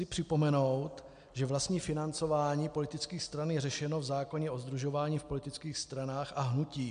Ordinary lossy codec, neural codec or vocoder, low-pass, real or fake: MP3, 64 kbps; none; 9.9 kHz; real